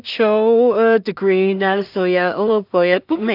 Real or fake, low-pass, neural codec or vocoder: fake; 5.4 kHz; codec, 16 kHz in and 24 kHz out, 0.4 kbps, LongCat-Audio-Codec, two codebook decoder